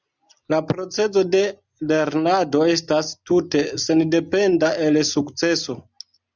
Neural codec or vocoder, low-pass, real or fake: none; 7.2 kHz; real